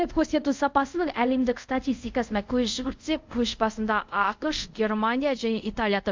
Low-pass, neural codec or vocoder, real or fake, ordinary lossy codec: 7.2 kHz; codec, 24 kHz, 0.5 kbps, DualCodec; fake; MP3, 64 kbps